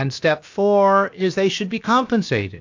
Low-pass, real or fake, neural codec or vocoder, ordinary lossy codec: 7.2 kHz; fake; codec, 16 kHz, about 1 kbps, DyCAST, with the encoder's durations; AAC, 48 kbps